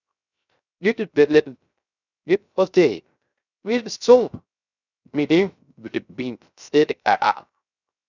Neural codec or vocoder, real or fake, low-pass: codec, 16 kHz, 0.3 kbps, FocalCodec; fake; 7.2 kHz